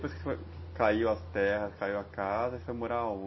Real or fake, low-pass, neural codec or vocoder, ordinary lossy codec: real; 7.2 kHz; none; MP3, 24 kbps